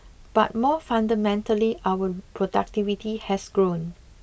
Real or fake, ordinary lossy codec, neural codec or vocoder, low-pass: real; none; none; none